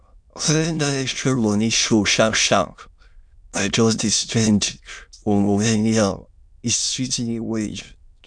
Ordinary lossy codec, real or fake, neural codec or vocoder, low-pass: none; fake; autoencoder, 22.05 kHz, a latent of 192 numbers a frame, VITS, trained on many speakers; 9.9 kHz